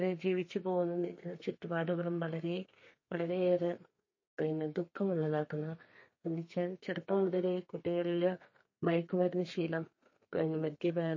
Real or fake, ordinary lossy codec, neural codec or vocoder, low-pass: fake; MP3, 32 kbps; codec, 32 kHz, 1.9 kbps, SNAC; 7.2 kHz